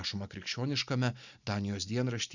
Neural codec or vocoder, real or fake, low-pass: none; real; 7.2 kHz